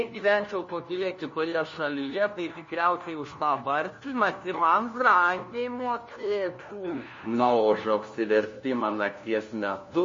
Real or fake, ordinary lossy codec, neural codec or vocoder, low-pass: fake; MP3, 32 kbps; codec, 16 kHz, 1 kbps, FunCodec, trained on LibriTTS, 50 frames a second; 7.2 kHz